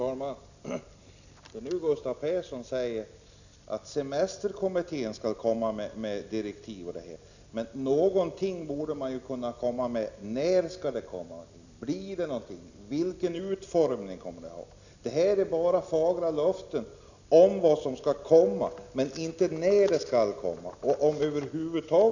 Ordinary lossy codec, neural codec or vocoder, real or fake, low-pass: none; none; real; 7.2 kHz